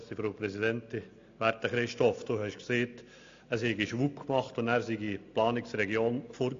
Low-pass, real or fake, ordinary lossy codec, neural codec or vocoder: 7.2 kHz; real; AAC, 48 kbps; none